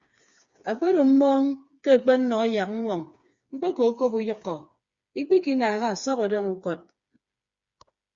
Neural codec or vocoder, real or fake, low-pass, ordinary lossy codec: codec, 16 kHz, 4 kbps, FreqCodec, smaller model; fake; 7.2 kHz; Opus, 64 kbps